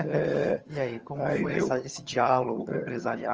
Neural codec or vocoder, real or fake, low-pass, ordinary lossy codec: vocoder, 22.05 kHz, 80 mel bands, HiFi-GAN; fake; 7.2 kHz; Opus, 24 kbps